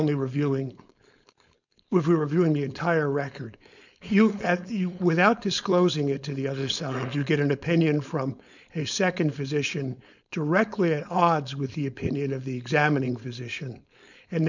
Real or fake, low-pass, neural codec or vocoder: fake; 7.2 kHz; codec, 16 kHz, 4.8 kbps, FACodec